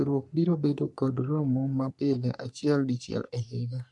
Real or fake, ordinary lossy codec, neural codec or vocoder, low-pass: fake; MP3, 64 kbps; codec, 44.1 kHz, 3.4 kbps, Pupu-Codec; 10.8 kHz